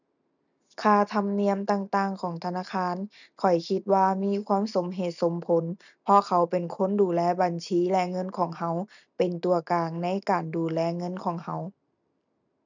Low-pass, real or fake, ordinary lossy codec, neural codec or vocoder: 7.2 kHz; real; none; none